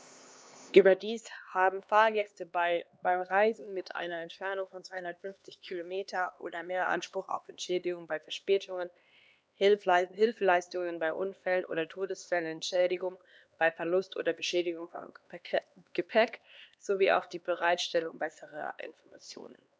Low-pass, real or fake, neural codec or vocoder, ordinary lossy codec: none; fake; codec, 16 kHz, 2 kbps, X-Codec, HuBERT features, trained on LibriSpeech; none